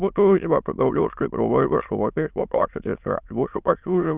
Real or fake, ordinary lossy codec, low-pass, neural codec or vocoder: fake; Opus, 32 kbps; 3.6 kHz; autoencoder, 22.05 kHz, a latent of 192 numbers a frame, VITS, trained on many speakers